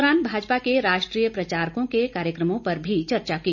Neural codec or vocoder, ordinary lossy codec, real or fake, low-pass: none; none; real; 7.2 kHz